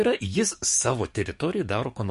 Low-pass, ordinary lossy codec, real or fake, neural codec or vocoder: 14.4 kHz; MP3, 48 kbps; fake; codec, 44.1 kHz, 7.8 kbps, DAC